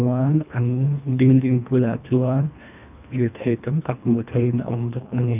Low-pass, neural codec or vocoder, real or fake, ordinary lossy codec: 3.6 kHz; codec, 24 kHz, 1.5 kbps, HILCodec; fake; none